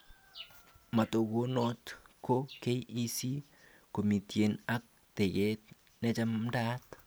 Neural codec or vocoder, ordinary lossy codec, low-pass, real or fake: none; none; none; real